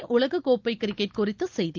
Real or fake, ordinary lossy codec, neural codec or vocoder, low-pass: real; Opus, 32 kbps; none; 7.2 kHz